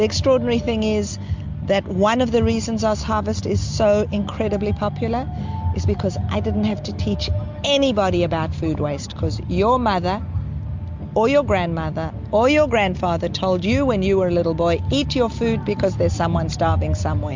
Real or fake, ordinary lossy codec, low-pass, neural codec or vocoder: real; MP3, 64 kbps; 7.2 kHz; none